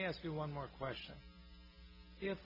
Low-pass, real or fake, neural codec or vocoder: 5.4 kHz; real; none